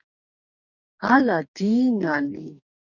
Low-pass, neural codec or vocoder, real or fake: 7.2 kHz; codec, 44.1 kHz, 2.6 kbps, DAC; fake